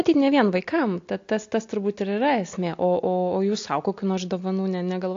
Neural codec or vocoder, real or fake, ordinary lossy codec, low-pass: none; real; AAC, 48 kbps; 7.2 kHz